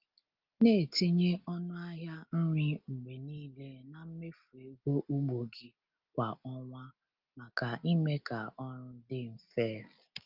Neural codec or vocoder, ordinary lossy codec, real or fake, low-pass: none; Opus, 24 kbps; real; 5.4 kHz